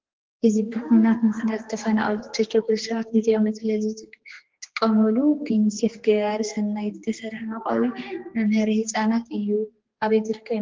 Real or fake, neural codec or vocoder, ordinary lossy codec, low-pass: fake; codec, 16 kHz, 2 kbps, X-Codec, HuBERT features, trained on general audio; Opus, 16 kbps; 7.2 kHz